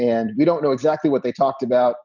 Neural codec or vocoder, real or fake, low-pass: none; real; 7.2 kHz